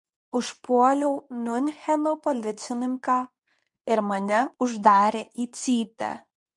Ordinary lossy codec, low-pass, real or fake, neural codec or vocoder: MP3, 96 kbps; 10.8 kHz; fake; codec, 24 kHz, 0.9 kbps, WavTokenizer, medium speech release version 2